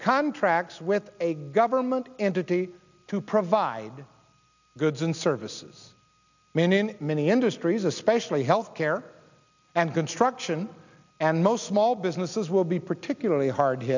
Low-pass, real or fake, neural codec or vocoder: 7.2 kHz; real; none